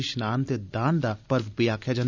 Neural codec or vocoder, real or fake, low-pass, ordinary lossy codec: none; real; 7.2 kHz; none